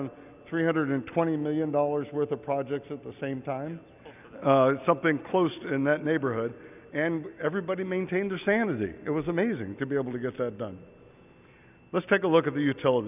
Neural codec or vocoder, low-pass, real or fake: none; 3.6 kHz; real